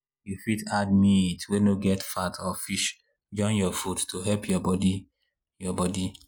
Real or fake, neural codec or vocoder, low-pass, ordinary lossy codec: real; none; none; none